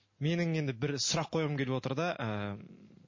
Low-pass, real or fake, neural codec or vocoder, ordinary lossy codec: 7.2 kHz; real; none; MP3, 32 kbps